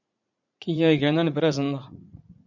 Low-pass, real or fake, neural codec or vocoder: 7.2 kHz; fake; vocoder, 44.1 kHz, 80 mel bands, Vocos